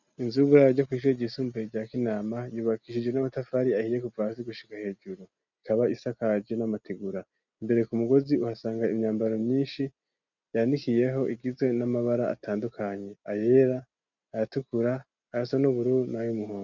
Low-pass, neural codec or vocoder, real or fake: 7.2 kHz; none; real